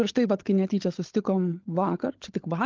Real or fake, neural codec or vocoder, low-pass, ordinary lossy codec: fake; codec, 16 kHz, 16 kbps, FunCodec, trained on LibriTTS, 50 frames a second; 7.2 kHz; Opus, 16 kbps